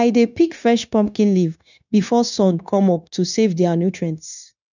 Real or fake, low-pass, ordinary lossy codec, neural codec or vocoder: fake; 7.2 kHz; none; codec, 16 kHz, 0.9 kbps, LongCat-Audio-Codec